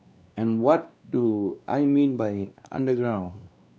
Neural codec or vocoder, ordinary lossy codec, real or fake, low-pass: codec, 16 kHz, 2 kbps, X-Codec, WavLM features, trained on Multilingual LibriSpeech; none; fake; none